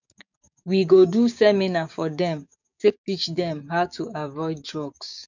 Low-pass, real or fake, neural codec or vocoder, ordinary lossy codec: 7.2 kHz; fake; codec, 16 kHz, 6 kbps, DAC; none